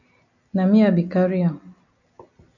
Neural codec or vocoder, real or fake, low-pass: none; real; 7.2 kHz